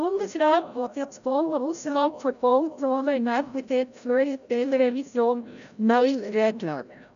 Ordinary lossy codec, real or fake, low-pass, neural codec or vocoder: none; fake; 7.2 kHz; codec, 16 kHz, 0.5 kbps, FreqCodec, larger model